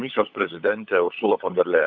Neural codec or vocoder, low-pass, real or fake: codec, 16 kHz, 16 kbps, FunCodec, trained on LibriTTS, 50 frames a second; 7.2 kHz; fake